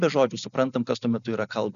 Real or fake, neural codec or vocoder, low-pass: real; none; 7.2 kHz